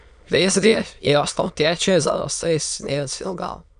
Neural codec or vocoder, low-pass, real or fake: autoencoder, 22.05 kHz, a latent of 192 numbers a frame, VITS, trained on many speakers; 9.9 kHz; fake